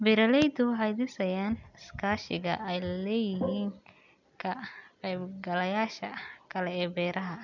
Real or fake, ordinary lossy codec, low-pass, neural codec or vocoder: real; none; 7.2 kHz; none